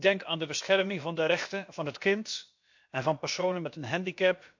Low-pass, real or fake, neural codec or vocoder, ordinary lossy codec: 7.2 kHz; fake; codec, 16 kHz, about 1 kbps, DyCAST, with the encoder's durations; MP3, 48 kbps